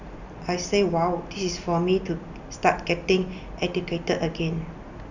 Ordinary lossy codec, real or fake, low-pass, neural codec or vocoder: none; real; 7.2 kHz; none